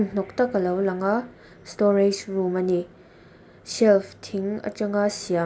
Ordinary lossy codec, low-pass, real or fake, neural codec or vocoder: none; none; real; none